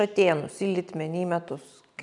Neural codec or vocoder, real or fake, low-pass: none; real; 10.8 kHz